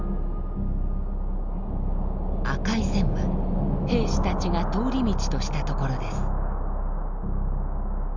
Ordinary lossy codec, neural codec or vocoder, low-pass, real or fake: none; none; 7.2 kHz; real